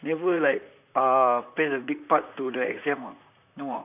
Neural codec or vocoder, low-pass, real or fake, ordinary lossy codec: vocoder, 44.1 kHz, 128 mel bands, Pupu-Vocoder; 3.6 kHz; fake; none